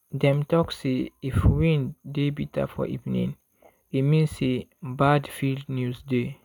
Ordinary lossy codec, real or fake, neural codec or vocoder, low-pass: none; real; none; 19.8 kHz